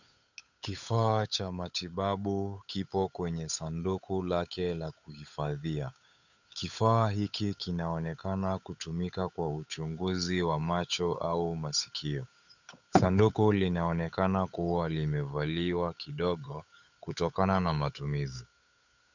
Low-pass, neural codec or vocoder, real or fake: 7.2 kHz; codec, 16 kHz, 8 kbps, FunCodec, trained on Chinese and English, 25 frames a second; fake